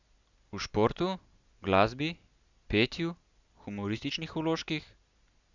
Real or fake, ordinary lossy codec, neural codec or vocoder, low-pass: real; Opus, 64 kbps; none; 7.2 kHz